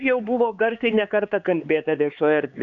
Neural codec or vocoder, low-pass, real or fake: codec, 16 kHz, 4 kbps, X-Codec, HuBERT features, trained on LibriSpeech; 7.2 kHz; fake